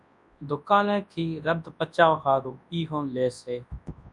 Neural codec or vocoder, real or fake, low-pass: codec, 24 kHz, 0.9 kbps, WavTokenizer, large speech release; fake; 10.8 kHz